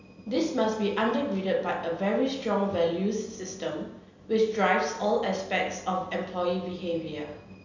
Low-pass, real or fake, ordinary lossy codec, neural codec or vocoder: 7.2 kHz; real; none; none